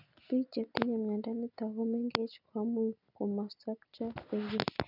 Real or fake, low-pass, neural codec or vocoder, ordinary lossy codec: real; 5.4 kHz; none; none